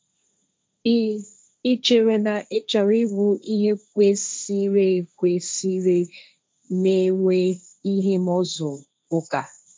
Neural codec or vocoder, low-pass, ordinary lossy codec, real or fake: codec, 16 kHz, 1.1 kbps, Voila-Tokenizer; none; none; fake